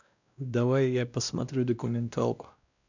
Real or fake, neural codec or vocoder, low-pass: fake; codec, 16 kHz, 1 kbps, X-Codec, WavLM features, trained on Multilingual LibriSpeech; 7.2 kHz